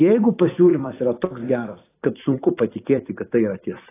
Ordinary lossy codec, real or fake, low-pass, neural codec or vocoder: AAC, 16 kbps; fake; 3.6 kHz; vocoder, 44.1 kHz, 128 mel bands every 256 samples, BigVGAN v2